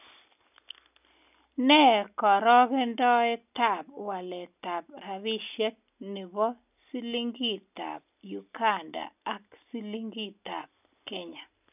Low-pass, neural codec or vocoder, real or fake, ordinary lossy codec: 3.6 kHz; none; real; none